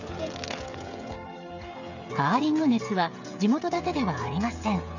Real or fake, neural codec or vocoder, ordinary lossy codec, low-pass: fake; codec, 16 kHz, 16 kbps, FreqCodec, smaller model; none; 7.2 kHz